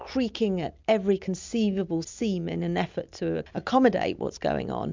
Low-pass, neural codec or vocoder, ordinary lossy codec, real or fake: 7.2 kHz; none; MP3, 64 kbps; real